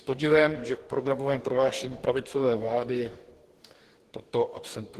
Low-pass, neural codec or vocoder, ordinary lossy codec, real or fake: 14.4 kHz; codec, 44.1 kHz, 2.6 kbps, DAC; Opus, 16 kbps; fake